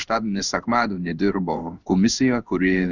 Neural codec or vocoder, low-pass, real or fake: codec, 16 kHz in and 24 kHz out, 1 kbps, XY-Tokenizer; 7.2 kHz; fake